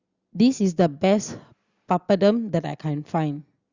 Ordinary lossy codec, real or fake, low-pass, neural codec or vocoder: Opus, 64 kbps; real; 7.2 kHz; none